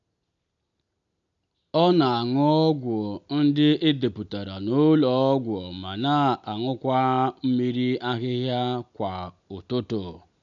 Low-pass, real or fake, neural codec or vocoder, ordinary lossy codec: 7.2 kHz; real; none; none